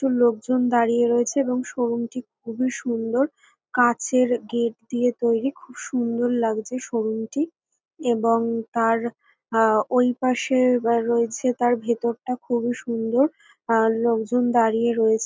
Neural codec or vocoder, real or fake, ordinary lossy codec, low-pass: none; real; none; none